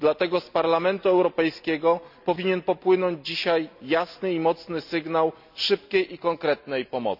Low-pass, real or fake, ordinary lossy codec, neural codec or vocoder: 5.4 kHz; real; none; none